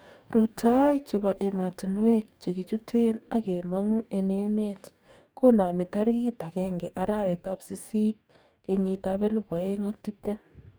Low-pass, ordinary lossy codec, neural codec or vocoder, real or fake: none; none; codec, 44.1 kHz, 2.6 kbps, DAC; fake